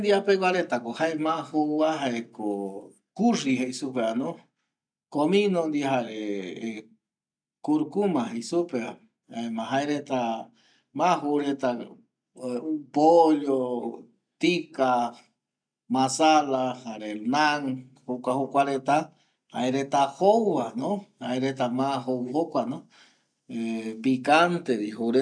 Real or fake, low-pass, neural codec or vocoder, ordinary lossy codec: real; 9.9 kHz; none; none